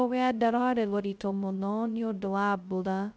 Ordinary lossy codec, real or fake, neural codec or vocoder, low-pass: none; fake; codec, 16 kHz, 0.2 kbps, FocalCodec; none